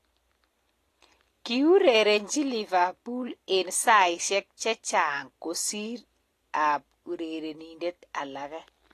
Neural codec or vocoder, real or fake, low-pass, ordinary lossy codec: none; real; 14.4 kHz; AAC, 48 kbps